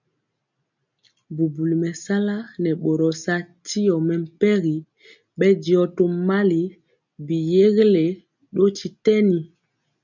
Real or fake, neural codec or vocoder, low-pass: real; none; 7.2 kHz